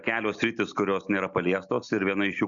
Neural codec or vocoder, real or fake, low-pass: none; real; 7.2 kHz